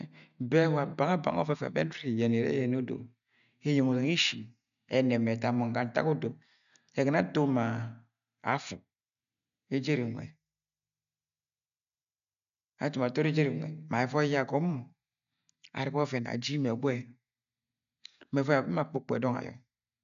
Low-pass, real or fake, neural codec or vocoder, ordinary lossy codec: 7.2 kHz; real; none; none